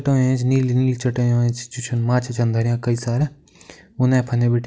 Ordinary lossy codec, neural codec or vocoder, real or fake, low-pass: none; none; real; none